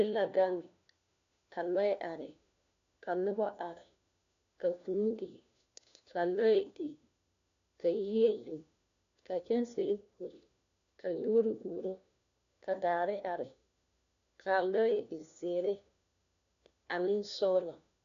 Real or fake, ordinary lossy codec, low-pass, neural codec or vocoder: fake; AAC, 64 kbps; 7.2 kHz; codec, 16 kHz, 1 kbps, FunCodec, trained on LibriTTS, 50 frames a second